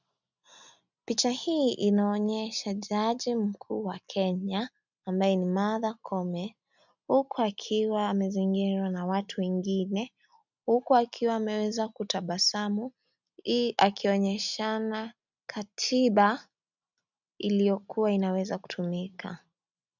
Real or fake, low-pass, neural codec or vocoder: real; 7.2 kHz; none